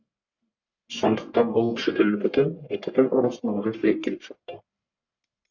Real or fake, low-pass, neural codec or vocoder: fake; 7.2 kHz; codec, 44.1 kHz, 1.7 kbps, Pupu-Codec